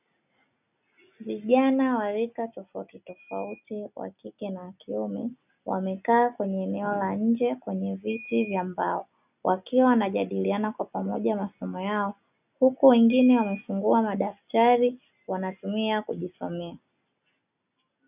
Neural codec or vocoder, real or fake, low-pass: none; real; 3.6 kHz